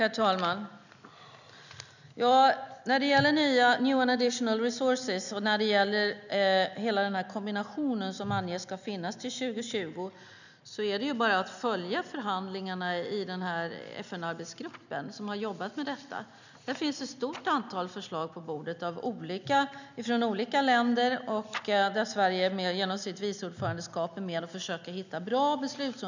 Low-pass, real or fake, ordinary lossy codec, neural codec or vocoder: 7.2 kHz; real; none; none